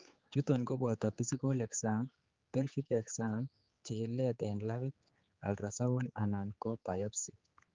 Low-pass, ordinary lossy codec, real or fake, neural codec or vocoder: 7.2 kHz; Opus, 32 kbps; fake; codec, 16 kHz, 4 kbps, X-Codec, HuBERT features, trained on general audio